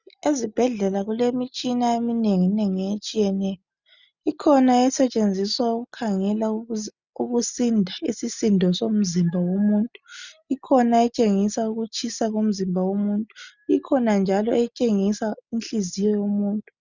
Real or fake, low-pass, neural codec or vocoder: real; 7.2 kHz; none